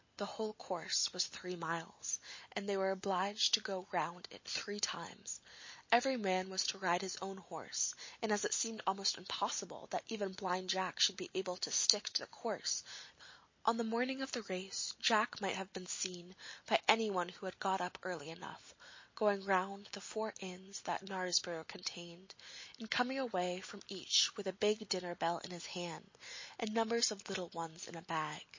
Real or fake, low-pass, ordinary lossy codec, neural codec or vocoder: real; 7.2 kHz; MP3, 32 kbps; none